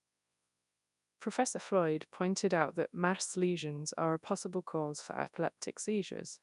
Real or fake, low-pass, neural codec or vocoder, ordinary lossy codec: fake; 10.8 kHz; codec, 24 kHz, 0.9 kbps, WavTokenizer, large speech release; none